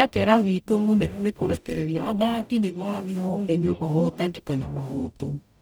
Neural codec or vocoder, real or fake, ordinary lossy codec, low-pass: codec, 44.1 kHz, 0.9 kbps, DAC; fake; none; none